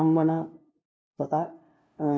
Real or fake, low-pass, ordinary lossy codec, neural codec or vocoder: fake; none; none; codec, 16 kHz, 0.5 kbps, FunCodec, trained on LibriTTS, 25 frames a second